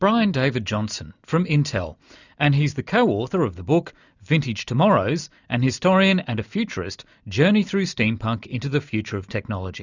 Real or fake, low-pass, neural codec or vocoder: real; 7.2 kHz; none